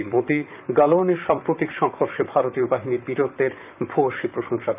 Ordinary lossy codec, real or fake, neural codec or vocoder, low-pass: none; fake; vocoder, 44.1 kHz, 128 mel bands, Pupu-Vocoder; 3.6 kHz